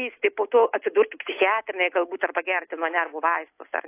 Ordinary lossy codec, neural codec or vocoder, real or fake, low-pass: AAC, 24 kbps; none; real; 3.6 kHz